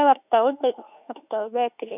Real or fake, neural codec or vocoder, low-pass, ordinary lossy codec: fake; autoencoder, 48 kHz, 32 numbers a frame, DAC-VAE, trained on Japanese speech; 3.6 kHz; none